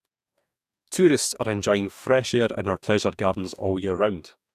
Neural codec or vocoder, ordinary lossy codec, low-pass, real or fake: codec, 44.1 kHz, 2.6 kbps, DAC; none; 14.4 kHz; fake